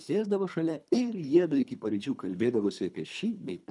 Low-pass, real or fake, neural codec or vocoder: 10.8 kHz; fake; codec, 24 kHz, 3 kbps, HILCodec